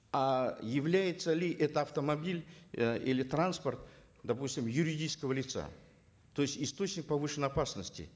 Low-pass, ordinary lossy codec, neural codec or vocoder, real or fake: none; none; none; real